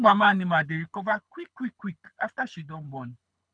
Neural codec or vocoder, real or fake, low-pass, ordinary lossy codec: codec, 24 kHz, 6 kbps, HILCodec; fake; 9.9 kHz; none